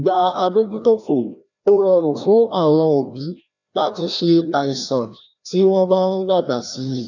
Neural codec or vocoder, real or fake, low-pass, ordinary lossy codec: codec, 16 kHz, 1 kbps, FreqCodec, larger model; fake; 7.2 kHz; none